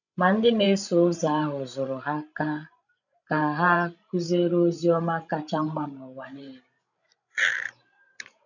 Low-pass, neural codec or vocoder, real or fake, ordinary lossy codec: 7.2 kHz; codec, 16 kHz, 16 kbps, FreqCodec, larger model; fake; none